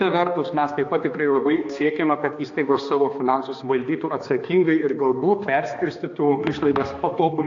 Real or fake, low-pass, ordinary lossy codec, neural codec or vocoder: fake; 7.2 kHz; AAC, 48 kbps; codec, 16 kHz, 2 kbps, X-Codec, HuBERT features, trained on balanced general audio